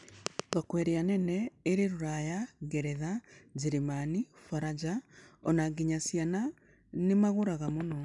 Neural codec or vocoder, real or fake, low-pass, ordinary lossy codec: none; real; 10.8 kHz; none